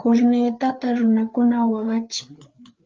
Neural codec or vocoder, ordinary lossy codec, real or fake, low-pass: codec, 16 kHz, 4 kbps, FreqCodec, larger model; Opus, 24 kbps; fake; 7.2 kHz